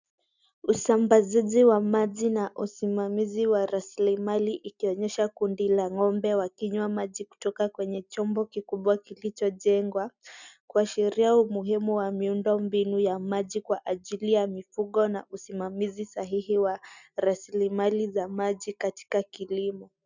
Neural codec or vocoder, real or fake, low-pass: none; real; 7.2 kHz